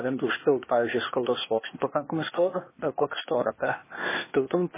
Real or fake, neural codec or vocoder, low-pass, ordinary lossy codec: fake; codec, 16 kHz, 0.8 kbps, ZipCodec; 3.6 kHz; MP3, 16 kbps